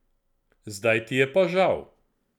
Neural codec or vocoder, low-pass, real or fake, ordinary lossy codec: none; 19.8 kHz; real; none